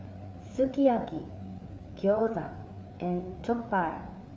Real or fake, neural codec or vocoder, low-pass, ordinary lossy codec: fake; codec, 16 kHz, 4 kbps, FreqCodec, larger model; none; none